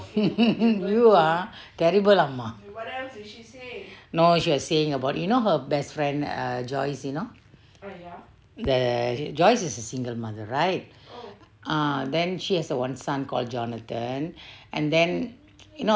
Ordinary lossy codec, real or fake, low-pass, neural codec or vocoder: none; real; none; none